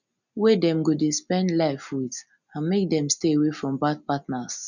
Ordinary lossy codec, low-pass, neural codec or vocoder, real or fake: none; 7.2 kHz; none; real